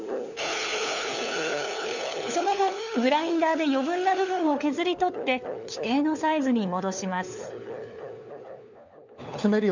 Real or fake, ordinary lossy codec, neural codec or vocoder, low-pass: fake; none; codec, 16 kHz, 4 kbps, FunCodec, trained on LibriTTS, 50 frames a second; 7.2 kHz